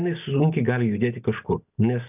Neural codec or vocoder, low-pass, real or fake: none; 3.6 kHz; real